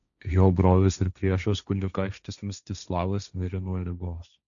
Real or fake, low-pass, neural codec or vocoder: fake; 7.2 kHz; codec, 16 kHz, 1.1 kbps, Voila-Tokenizer